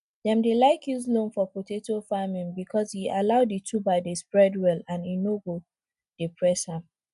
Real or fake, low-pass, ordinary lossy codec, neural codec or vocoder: real; 10.8 kHz; MP3, 96 kbps; none